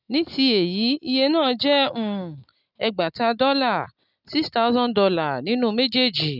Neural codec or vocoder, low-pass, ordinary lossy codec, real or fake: none; 5.4 kHz; none; real